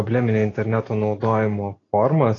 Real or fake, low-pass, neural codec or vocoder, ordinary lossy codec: real; 7.2 kHz; none; AAC, 32 kbps